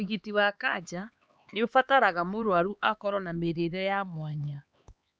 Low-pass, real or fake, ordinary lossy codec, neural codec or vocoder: none; fake; none; codec, 16 kHz, 4 kbps, X-Codec, HuBERT features, trained on LibriSpeech